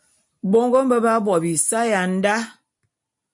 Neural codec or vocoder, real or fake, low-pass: none; real; 10.8 kHz